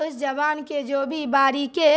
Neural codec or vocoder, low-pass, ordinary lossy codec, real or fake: none; none; none; real